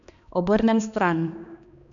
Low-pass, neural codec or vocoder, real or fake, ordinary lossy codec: 7.2 kHz; codec, 16 kHz, 2 kbps, X-Codec, HuBERT features, trained on balanced general audio; fake; none